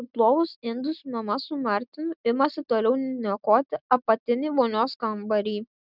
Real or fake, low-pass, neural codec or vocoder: real; 5.4 kHz; none